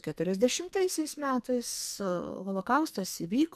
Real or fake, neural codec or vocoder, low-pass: fake; codec, 44.1 kHz, 2.6 kbps, SNAC; 14.4 kHz